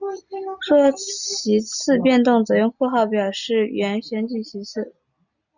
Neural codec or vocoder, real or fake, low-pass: none; real; 7.2 kHz